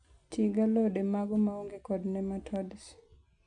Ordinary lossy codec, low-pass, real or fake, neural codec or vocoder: none; 9.9 kHz; real; none